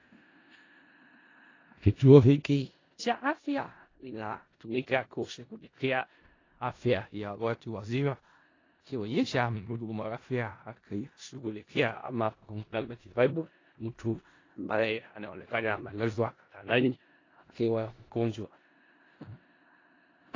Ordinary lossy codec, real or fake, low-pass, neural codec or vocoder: AAC, 32 kbps; fake; 7.2 kHz; codec, 16 kHz in and 24 kHz out, 0.4 kbps, LongCat-Audio-Codec, four codebook decoder